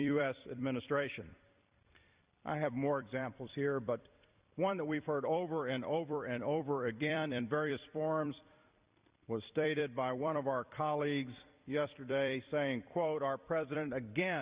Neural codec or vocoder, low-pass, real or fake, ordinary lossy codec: vocoder, 44.1 kHz, 128 mel bands every 256 samples, BigVGAN v2; 3.6 kHz; fake; Opus, 64 kbps